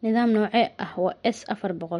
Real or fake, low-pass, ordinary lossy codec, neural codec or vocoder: real; 19.8 kHz; MP3, 48 kbps; none